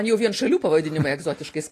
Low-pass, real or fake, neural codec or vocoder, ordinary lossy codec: 14.4 kHz; real; none; AAC, 48 kbps